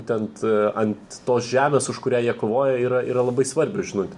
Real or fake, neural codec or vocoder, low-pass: real; none; 10.8 kHz